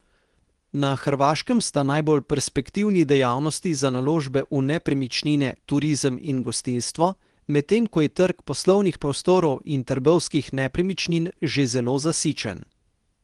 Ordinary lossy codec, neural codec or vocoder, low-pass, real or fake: Opus, 24 kbps; codec, 24 kHz, 0.9 kbps, WavTokenizer, medium speech release version 2; 10.8 kHz; fake